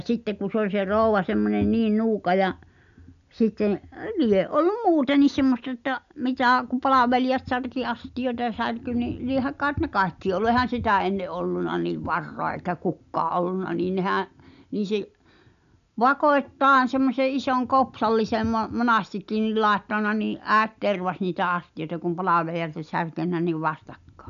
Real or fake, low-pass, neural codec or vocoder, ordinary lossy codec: real; 7.2 kHz; none; none